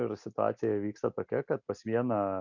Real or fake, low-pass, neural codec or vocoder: real; 7.2 kHz; none